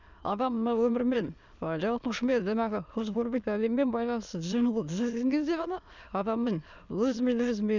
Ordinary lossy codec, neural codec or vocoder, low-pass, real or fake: none; autoencoder, 22.05 kHz, a latent of 192 numbers a frame, VITS, trained on many speakers; 7.2 kHz; fake